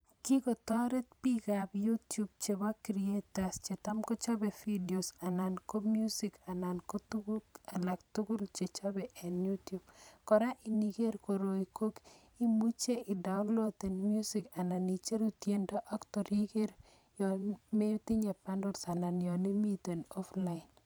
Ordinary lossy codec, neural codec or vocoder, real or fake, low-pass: none; vocoder, 44.1 kHz, 128 mel bands every 512 samples, BigVGAN v2; fake; none